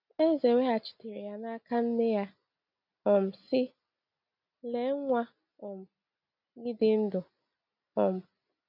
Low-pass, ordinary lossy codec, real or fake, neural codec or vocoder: 5.4 kHz; none; real; none